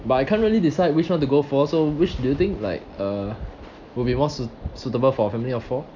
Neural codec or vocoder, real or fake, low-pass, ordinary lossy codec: none; real; 7.2 kHz; none